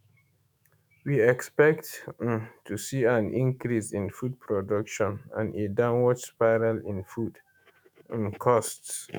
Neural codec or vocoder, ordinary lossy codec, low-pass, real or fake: autoencoder, 48 kHz, 128 numbers a frame, DAC-VAE, trained on Japanese speech; none; none; fake